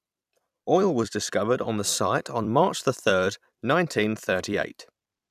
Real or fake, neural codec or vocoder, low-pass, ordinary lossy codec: fake; vocoder, 44.1 kHz, 128 mel bands every 256 samples, BigVGAN v2; 14.4 kHz; none